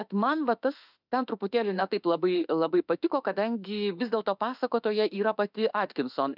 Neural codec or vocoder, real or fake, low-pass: autoencoder, 48 kHz, 32 numbers a frame, DAC-VAE, trained on Japanese speech; fake; 5.4 kHz